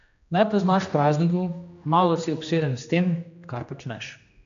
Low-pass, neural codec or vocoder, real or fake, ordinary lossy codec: 7.2 kHz; codec, 16 kHz, 1 kbps, X-Codec, HuBERT features, trained on general audio; fake; MP3, 64 kbps